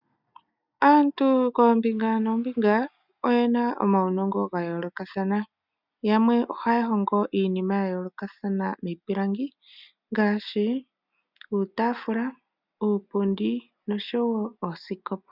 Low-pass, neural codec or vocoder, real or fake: 5.4 kHz; none; real